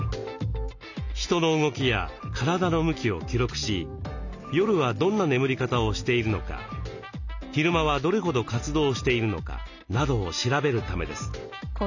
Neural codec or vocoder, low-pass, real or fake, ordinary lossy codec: none; 7.2 kHz; real; none